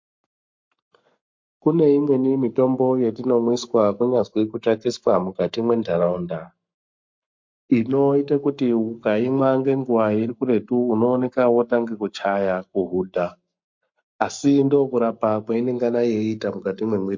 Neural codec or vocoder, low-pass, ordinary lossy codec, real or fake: codec, 44.1 kHz, 7.8 kbps, Pupu-Codec; 7.2 kHz; MP3, 48 kbps; fake